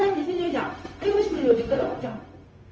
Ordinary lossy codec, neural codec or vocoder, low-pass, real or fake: Opus, 24 kbps; codec, 16 kHz, 0.4 kbps, LongCat-Audio-Codec; 7.2 kHz; fake